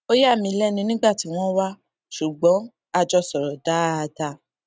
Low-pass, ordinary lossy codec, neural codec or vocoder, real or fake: none; none; none; real